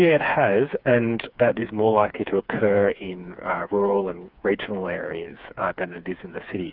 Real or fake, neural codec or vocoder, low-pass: fake; codec, 16 kHz, 4 kbps, FreqCodec, smaller model; 5.4 kHz